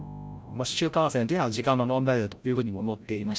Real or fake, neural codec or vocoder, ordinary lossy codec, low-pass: fake; codec, 16 kHz, 0.5 kbps, FreqCodec, larger model; none; none